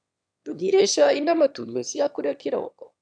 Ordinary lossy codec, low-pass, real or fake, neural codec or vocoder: none; 9.9 kHz; fake; autoencoder, 22.05 kHz, a latent of 192 numbers a frame, VITS, trained on one speaker